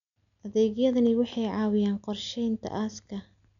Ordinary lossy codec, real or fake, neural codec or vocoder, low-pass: none; real; none; 7.2 kHz